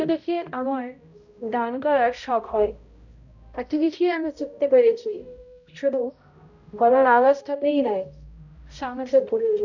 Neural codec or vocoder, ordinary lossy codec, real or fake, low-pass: codec, 16 kHz, 0.5 kbps, X-Codec, HuBERT features, trained on balanced general audio; none; fake; 7.2 kHz